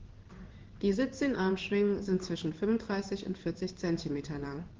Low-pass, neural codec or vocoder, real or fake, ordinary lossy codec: 7.2 kHz; codec, 16 kHz in and 24 kHz out, 1 kbps, XY-Tokenizer; fake; Opus, 16 kbps